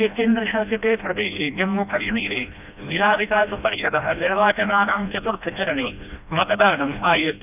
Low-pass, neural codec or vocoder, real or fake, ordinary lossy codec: 3.6 kHz; codec, 16 kHz, 1 kbps, FreqCodec, smaller model; fake; none